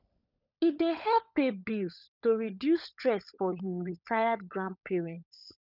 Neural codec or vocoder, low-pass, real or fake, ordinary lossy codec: codec, 16 kHz, 16 kbps, FunCodec, trained on LibriTTS, 50 frames a second; 5.4 kHz; fake; none